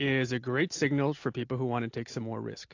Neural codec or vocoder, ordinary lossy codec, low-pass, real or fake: none; AAC, 48 kbps; 7.2 kHz; real